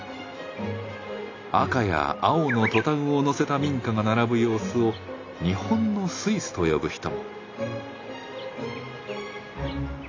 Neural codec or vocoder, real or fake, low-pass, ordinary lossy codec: none; real; 7.2 kHz; AAC, 32 kbps